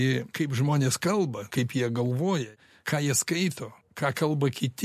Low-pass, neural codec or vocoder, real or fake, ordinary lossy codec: 14.4 kHz; none; real; MP3, 64 kbps